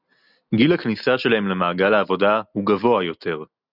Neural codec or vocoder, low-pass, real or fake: none; 5.4 kHz; real